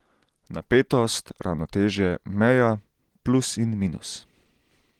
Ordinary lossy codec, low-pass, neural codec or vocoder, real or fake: Opus, 16 kbps; 19.8 kHz; none; real